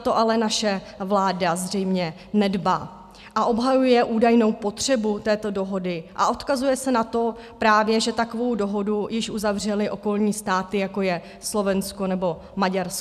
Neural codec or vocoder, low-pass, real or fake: none; 14.4 kHz; real